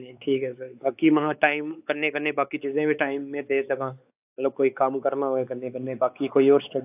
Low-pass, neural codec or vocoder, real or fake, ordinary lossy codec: 3.6 kHz; codec, 16 kHz, 4 kbps, X-Codec, WavLM features, trained on Multilingual LibriSpeech; fake; AAC, 32 kbps